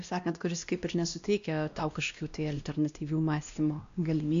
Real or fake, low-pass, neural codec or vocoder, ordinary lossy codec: fake; 7.2 kHz; codec, 16 kHz, 1 kbps, X-Codec, WavLM features, trained on Multilingual LibriSpeech; MP3, 64 kbps